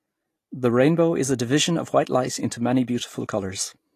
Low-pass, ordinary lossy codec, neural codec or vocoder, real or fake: 14.4 kHz; AAC, 48 kbps; none; real